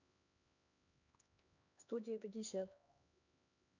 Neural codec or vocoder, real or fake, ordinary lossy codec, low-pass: codec, 16 kHz, 2 kbps, X-Codec, HuBERT features, trained on LibriSpeech; fake; none; 7.2 kHz